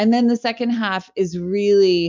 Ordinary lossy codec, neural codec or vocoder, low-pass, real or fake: MP3, 64 kbps; none; 7.2 kHz; real